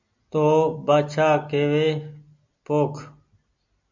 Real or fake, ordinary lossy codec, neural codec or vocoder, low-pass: real; MP3, 64 kbps; none; 7.2 kHz